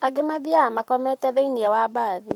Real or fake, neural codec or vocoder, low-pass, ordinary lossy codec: fake; codec, 44.1 kHz, 7.8 kbps, Pupu-Codec; 19.8 kHz; none